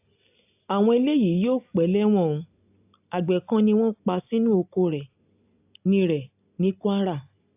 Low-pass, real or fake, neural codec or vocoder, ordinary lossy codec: 3.6 kHz; real; none; AAC, 32 kbps